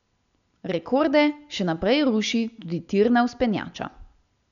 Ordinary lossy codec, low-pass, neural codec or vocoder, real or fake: none; 7.2 kHz; none; real